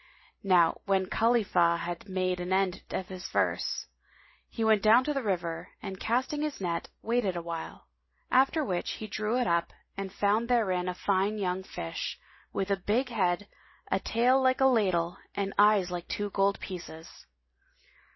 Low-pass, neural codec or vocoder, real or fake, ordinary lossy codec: 7.2 kHz; none; real; MP3, 24 kbps